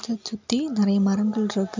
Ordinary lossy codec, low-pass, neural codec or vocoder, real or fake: MP3, 64 kbps; 7.2 kHz; none; real